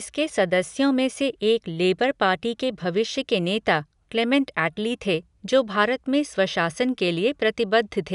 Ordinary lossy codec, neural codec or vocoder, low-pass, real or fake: none; none; 10.8 kHz; real